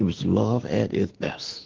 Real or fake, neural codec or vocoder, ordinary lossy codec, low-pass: fake; autoencoder, 48 kHz, 32 numbers a frame, DAC-VAE, trained on Japanese speech; Opus, 16 kbps; 7.2 kHz